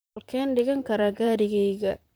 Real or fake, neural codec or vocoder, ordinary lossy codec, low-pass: fake; vocoder, 44.1 kHz, 128 mel bands, Pupu-Vocoder; none; none